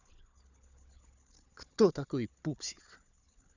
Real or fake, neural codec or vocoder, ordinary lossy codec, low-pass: fake; codec, 16 kHz, 4 kbps, FunCodec, trained on Chinese and English, 50 frames a second; none; 7.2 kHz